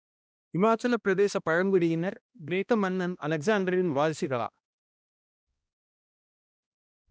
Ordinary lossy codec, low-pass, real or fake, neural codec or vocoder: none; none; fake; codec, 16 kHz, 1 kbps, X-Codec, HuBERT features, trained on balanced general audio